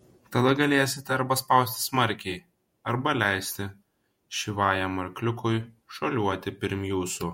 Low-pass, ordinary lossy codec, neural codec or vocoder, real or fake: 19.8 kHz; MP3, 64 kbps; vocoder, 48 kHz, 128 mel bands, Vocos; fake